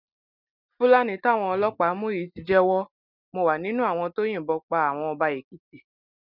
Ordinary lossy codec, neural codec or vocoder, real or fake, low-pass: none; none; real; 5.4 kHz